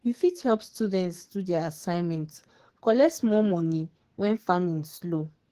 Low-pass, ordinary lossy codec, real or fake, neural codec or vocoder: 14.4 kHz; Opus, 16 kbps; fake; codec, 44.1 kHz, 2.6 kbps, SNAC